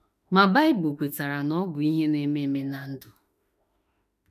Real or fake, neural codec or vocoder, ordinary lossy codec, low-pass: fake; autoencoder, 48 kHz, 32 numbers a frame, DAC-VAE, trained on Japanese speech; none; 14.4 kHz